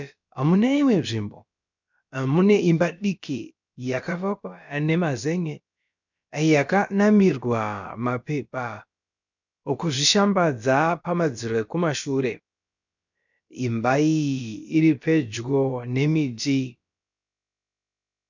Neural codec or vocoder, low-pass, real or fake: codec, 16 kHz, about 1 kbps, DyCAST, with the encoder's durations; 7.2 kHz; fake